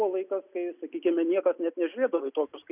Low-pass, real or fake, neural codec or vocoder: 3.6 kHz; real; none